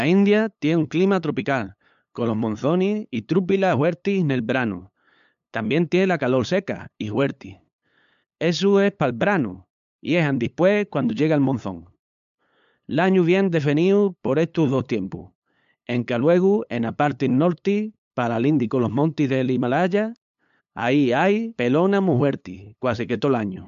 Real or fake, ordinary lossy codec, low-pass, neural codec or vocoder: fake; MP3, 64 kbps; 7.2 kHz; codec, 16 kHz, 8 kbps, FunCodec, trained on LibriTTS, 25 frames a second